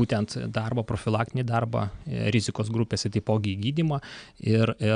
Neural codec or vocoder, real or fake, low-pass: none; real; 9.9 kHz